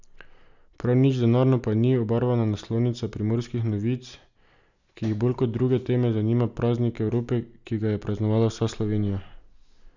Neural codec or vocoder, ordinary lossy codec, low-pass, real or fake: none; none; 7.2 kHz; real